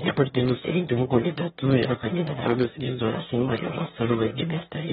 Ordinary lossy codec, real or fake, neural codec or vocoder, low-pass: AAC, 16 kbps; fake; autoencoder, 22.05 kHz, a latent of 192 numbers a frame, VITS, trained on one speaker; 9.9 kHz